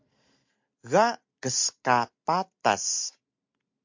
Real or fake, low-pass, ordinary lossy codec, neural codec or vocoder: real; 7.2 kHz; MP3, 48 kbps; none